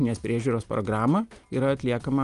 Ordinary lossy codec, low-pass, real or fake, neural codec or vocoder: Opus, 32 kbps; 10.8 kHz; real; none